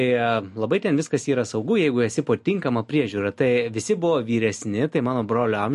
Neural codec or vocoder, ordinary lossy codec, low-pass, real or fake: none; MP3, 48 kbps; 14.4 kHz; real